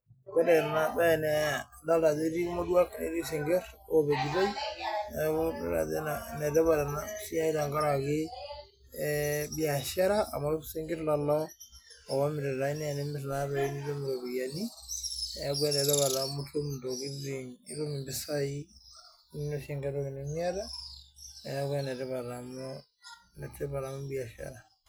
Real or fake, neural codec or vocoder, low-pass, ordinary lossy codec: real; none; none; none